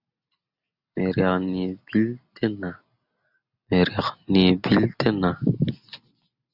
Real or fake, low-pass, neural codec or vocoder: real; 5.4 kHz; none